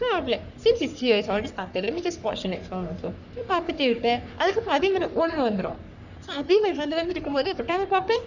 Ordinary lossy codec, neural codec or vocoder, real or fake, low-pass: none; codec, 44.1 kHz, 3.4 kbps, Pupu-Codec; fake; 7.2 kHz